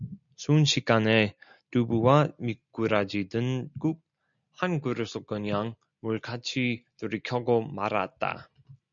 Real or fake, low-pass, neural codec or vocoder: real; 7.2 kHz; none